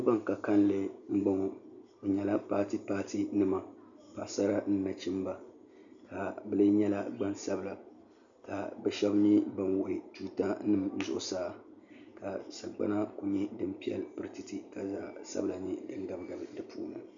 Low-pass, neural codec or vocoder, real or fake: 7.2 kHz; none; real